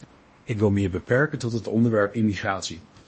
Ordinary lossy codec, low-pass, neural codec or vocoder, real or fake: MP3, 32 kbps; 10.8 kHz; codec, 16 kHz in and 24 kHz out, 0.8 kbps, FocalCodec, streaming, 65536 codes; fake